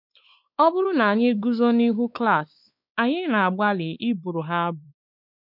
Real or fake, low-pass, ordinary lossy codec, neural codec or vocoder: fake; 5.4 kHz; none; codec, 16 kHz, 4 kbps, X-Codec, WavLM features, trained on Multilingual LibriSpeech